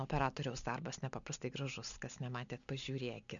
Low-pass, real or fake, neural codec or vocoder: 7.2 kHz; real; none